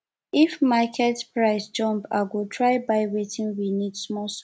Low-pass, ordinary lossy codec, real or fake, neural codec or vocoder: none; none; real; none